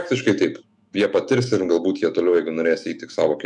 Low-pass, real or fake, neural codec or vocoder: 10.8 kHz; fake; autoencoder, 48 kHz, 128 numbers a frame, DAC-VAE, trained on Japanese speech